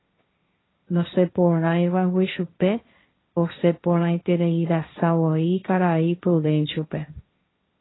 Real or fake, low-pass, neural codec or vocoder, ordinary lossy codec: fake; 7.2 kHz; codec, 16 kHz, 1.1 kbps, Voila-Tokenizer; AAC, 16 kbps